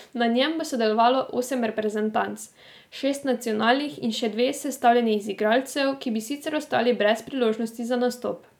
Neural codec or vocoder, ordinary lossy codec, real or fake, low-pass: none; none; real; 19.8 kHz